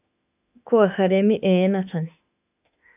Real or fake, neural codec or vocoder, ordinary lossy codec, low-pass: fake; autoencoder, 48 kHz, 32 numbers a frame, DAC-VAE, trained on Japanese speech; AAC, 32 kbps; 3.6 kHz